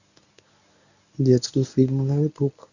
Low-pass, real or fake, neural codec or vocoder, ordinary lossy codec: 7.2 kHz; fake; codec, 24 kHz, 0.9 kbps, WavTokenizer, medium speech release version 1; none